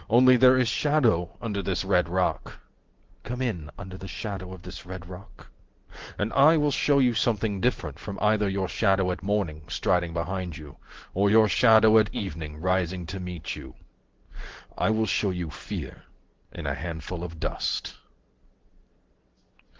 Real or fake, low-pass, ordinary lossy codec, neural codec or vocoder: real; 7.2 kHz; Opus, 16 kbps; none